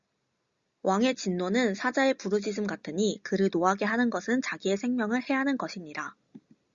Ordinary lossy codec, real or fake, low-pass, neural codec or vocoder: Opus, 64 kbps; real; 7.2 kHz; none